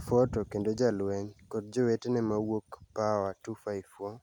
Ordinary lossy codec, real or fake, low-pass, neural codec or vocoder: none; real; 19.8 kHz; none